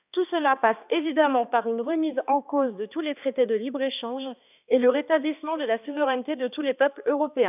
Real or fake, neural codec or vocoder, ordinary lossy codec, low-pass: fake; codec, 16 kHz, 2 kbps, X-Codec, HuBERT features, trained on balanced general audio; none; 3.6 kHz